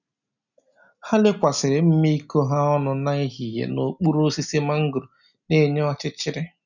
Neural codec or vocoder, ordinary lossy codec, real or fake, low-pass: none; none; real; 7.2 kHz